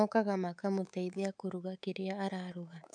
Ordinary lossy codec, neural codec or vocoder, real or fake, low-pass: none; codec, 24 kHz, 3.1 kbps, DualCodec; fake; 9.9 kHz